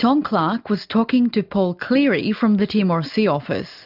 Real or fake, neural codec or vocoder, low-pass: real; none; 5.4 kHz